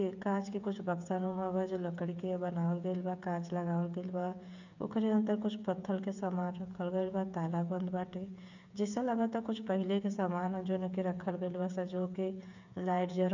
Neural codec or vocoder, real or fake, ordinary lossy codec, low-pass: codec, 16 kHz, 8 kbps, FreqCodec, smaller model; fake; none; 7.2 kHz